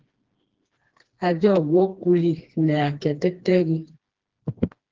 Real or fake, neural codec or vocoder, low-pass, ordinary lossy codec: fake; codec, 16 kHz, 2 kbps, FreqCodec, smaller model; 7.2 kHz; Opus, 16 kbps